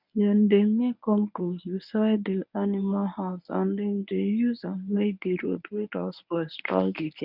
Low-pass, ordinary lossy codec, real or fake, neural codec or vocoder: 5.4 kHz; none; fake; codec, 24 kHz, 0.9 kbps, WavTokenizer, medium speech release version 1